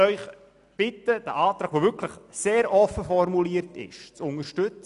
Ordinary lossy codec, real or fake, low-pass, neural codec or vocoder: none; real; 10.8 kHz; none